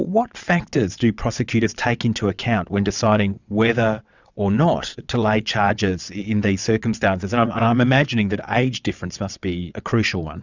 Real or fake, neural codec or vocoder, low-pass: fake; vocoder, 22.05 kHz, 80 mel bands, WaveNeXt; 7.2 kHz